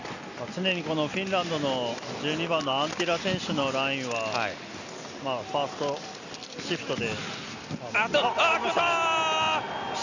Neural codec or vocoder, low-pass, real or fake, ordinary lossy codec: none; 7.2 kHz; real; none